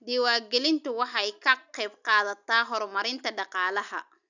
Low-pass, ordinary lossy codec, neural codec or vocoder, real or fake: 7.2 kHz; none; none; real